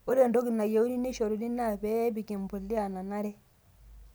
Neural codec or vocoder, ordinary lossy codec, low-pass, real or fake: none; none; none; real